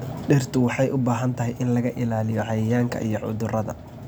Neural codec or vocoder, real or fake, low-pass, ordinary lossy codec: none; real; none; none